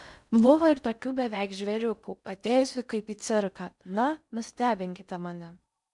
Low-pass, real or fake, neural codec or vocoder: 10.8 kHz; fake; codec, 16 kHz in and 24 kHz out, 0.6 kbps, FocalCodec, streaming, 4096 codes